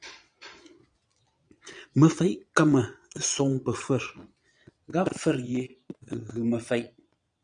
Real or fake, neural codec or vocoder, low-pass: fake; vocoder, 22.05 kHz, 80 mel bands, Vocos; 9.9 kHz